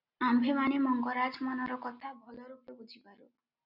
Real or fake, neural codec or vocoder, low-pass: real; none; 5.4 kHz